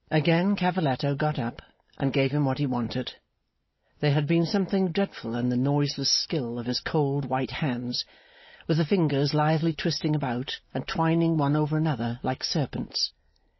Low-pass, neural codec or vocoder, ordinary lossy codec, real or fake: 7.2 kHz; none; MP3, 24 kbps; real